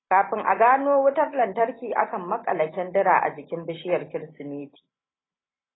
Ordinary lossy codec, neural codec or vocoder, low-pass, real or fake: AAC, 16 kbps; none; 7.2 kHz; real